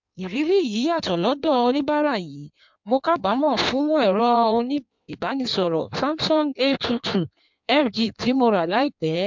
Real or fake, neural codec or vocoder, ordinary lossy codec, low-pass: fake; codec, 16 kHz in and 24 kHz out, 1.1 kbps, FireRedTTS-2 codec; MP3, 64 kbps; 7.2 kHz